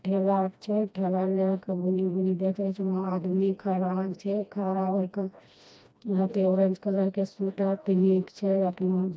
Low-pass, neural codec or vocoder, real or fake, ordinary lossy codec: none; codec, 16 kHz, 1 kbps, FreqCodec, smaller model; fake; none